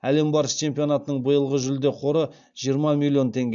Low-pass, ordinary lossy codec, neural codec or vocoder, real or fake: 7.2 kHz; AAC, 64 kbps; none; real